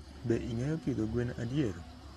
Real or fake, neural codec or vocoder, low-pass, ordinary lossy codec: real; none; 19.8 kHz; AAC, 32 kbps